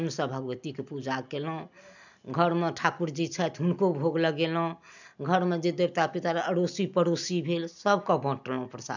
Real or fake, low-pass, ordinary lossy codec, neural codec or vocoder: real; 7.2 kHz; none; none